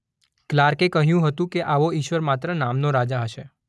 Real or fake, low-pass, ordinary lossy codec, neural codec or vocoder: real; none; none; none